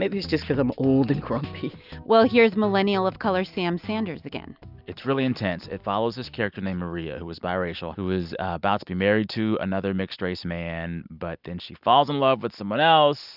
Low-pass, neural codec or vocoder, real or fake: 5.4 kHz; none; real